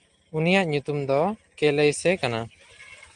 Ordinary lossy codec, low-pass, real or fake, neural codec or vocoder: Opus, 24 kbps; 9.9 kHz; real; none